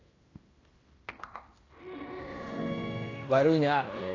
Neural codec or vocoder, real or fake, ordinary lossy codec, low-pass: codec, 16 kHz in and 24 kHz out, 0.9 kbps, LongCat-Audio-Codec, fine tuned four codebook decoder; fake; AAC, 32 kbps; 7.2 kHz